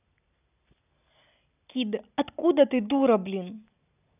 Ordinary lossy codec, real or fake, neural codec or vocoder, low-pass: none; real; none; 3.6 kHz